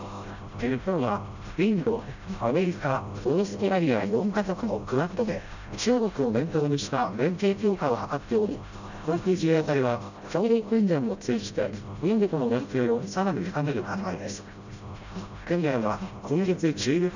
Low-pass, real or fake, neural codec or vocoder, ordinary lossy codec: 7.2 kHz; fake; codec, 16 kHz, 0.5 kbps, FreqCodec, smaller model; none